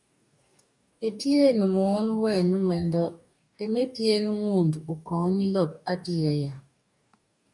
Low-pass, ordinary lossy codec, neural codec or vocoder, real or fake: 10.8 kHz; MP3, 96 kbps; codec, 44.1 kHz, 2.6 kbps, DAC; fake